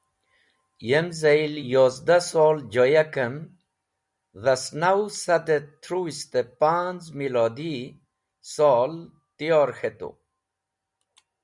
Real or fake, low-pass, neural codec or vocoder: real; 10.8 kHz; none